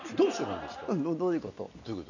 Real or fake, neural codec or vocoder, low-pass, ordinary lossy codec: real; none; 7.2 kHz; none